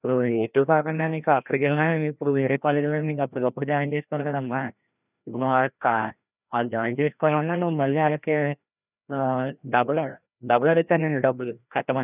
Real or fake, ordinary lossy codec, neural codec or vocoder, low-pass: fake; none; codec, 16 kHz, 1 kbps, FreqCodec, larger model; 3.6 kHz